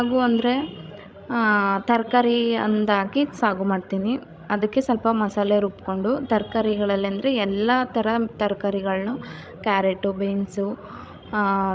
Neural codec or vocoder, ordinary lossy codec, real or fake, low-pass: codec, 16 kHz, 16 kbps, FreqCodec, larger model; none; fake; none